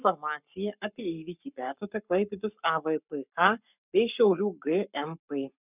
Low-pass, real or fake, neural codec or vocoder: 3.6 kHz; fake; codec, 44.1 kHz, 7.8 kbps, Pupu-Codec